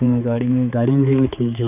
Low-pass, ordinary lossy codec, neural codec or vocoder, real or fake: 3.6 kHz; none; codec, 16 kHz, 4 kbps, X-Codec, HuBERT features, trained on balanced general audio; fake